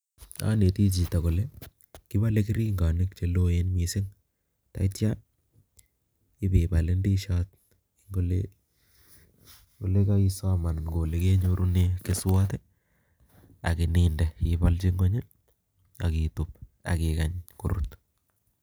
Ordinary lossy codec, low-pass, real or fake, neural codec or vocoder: none; none; real; none